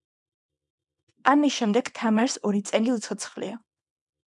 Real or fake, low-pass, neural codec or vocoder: fake; 10.8 kHz; codec, 24 kHz, 0.9 kbps, WavTokenizer, small release